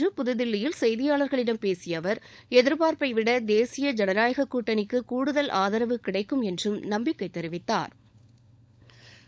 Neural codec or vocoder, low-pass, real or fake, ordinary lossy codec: codec, 16 kHz, 16 kbps, FunCodec, trained on LibriTTS, 50 frames a second; none; fake; none